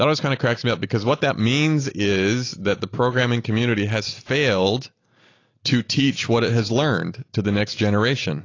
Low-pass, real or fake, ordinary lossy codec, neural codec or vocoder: 7.2 kHz; real; AAC, 32 kbps; none